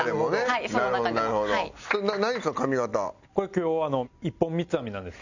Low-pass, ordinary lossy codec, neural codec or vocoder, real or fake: 7.2 kHz; none; none; real